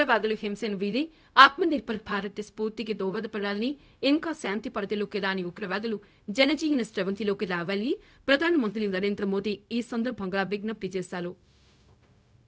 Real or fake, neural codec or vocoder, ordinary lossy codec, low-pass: fake; codec, 16 kHz, 0.4 kbps, LongCat-Audio-Codec; none; none